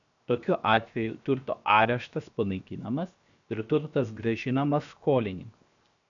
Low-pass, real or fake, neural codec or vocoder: 7.2 kHz; fake; codec, 16 kHz, 0.7 kbps, FocalCodec